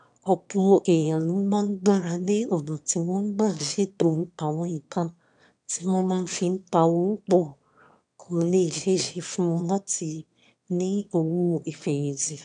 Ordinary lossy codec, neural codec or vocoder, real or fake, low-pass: none; autoencoder, 22.05 kHz, a latent of 192 numbers a frame, VITS, trained on one speaker; fake; 9.9 kHz